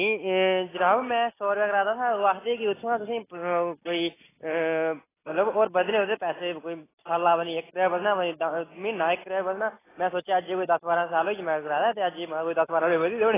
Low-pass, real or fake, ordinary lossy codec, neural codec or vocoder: 3.6 kHz; real; AAC, 16 kbps; none